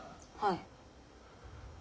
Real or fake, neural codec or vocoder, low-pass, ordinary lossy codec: real; none; none; none